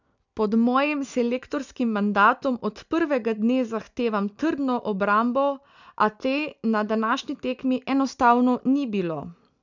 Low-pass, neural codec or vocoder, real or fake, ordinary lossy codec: 7.2 kHz; none; real; none